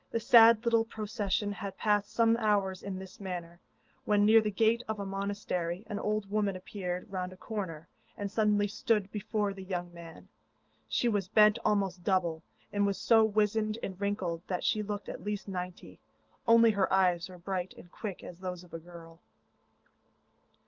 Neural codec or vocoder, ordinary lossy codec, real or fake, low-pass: none; Opus, 24 kbps; real; 7.2 kHz